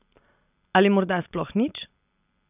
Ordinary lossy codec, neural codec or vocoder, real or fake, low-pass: none; none; real; 3.6 kHz